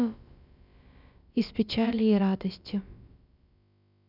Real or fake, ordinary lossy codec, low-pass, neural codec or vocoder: fake; none; 5.4 kHz; codec, 16 kHz, about 1 kbps, DyCAST, with the encoder's durations